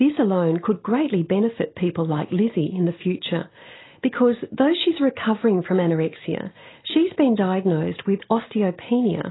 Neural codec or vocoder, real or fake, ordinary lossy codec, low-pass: none; real; AAC, 16 kbps; 7.2 kHz